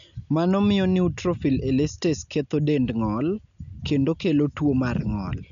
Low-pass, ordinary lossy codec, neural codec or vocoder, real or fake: 7.2 kHz; none; none; real